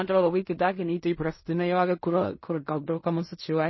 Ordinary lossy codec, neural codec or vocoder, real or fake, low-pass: MP3, 24 kbps; codec, 16 kHz in and 24 kHz out, 0.4 kbps, LongCat-Audio-Codec, fine tuned four codebook decoder; fake; 7.2 kHz